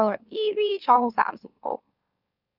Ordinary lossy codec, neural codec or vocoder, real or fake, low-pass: none; autoencoder, 44.1 kHz, a latent of 192 numbers a frame, MeloTTS; fake; 5.4 kHz